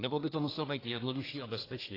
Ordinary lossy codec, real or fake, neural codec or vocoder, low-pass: AAC, 24 kbps; fake; codec, 24 kHz, 1 kbps, SNAC; 5.4 kHz